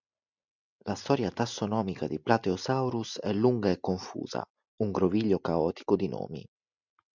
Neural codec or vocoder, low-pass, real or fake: none; 7.2 kHz; real